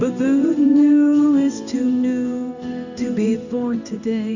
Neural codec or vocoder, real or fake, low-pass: codec, 16 kHz in and 24 kHz out, 1 kbps, XY-Tokenizer; fake; 7.2 kHz